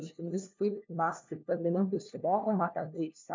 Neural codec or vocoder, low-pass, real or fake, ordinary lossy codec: codec, 16 kHz, 1 kbps, FunCodec, trained on LibriTTS, 50 frames a second; 7.2 kHz; fake; MP3, 64 kbps